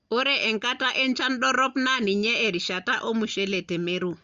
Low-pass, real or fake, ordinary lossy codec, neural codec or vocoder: 7.2 kHz; real; Opus, 32 kbps; none